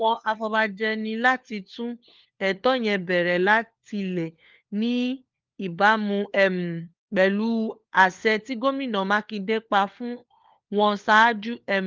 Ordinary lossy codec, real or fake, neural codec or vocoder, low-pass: Opus, 32 kbps; fake; codec, 16 kHz, 4 kbps, FunCodec, trained on LibriTTS, 50 frames a second; 7.2 kHz